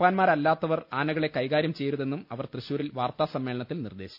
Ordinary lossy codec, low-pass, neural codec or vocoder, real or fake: none; 5.4 kHz; none; real